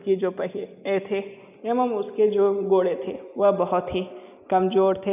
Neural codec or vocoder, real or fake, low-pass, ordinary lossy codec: none; real; 3.6 kHz; none